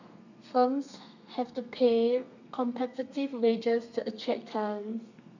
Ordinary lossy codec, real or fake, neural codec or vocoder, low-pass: none; fake; codec, 32 kHz, 1.9 kbps, SNAC; 7.2 kHz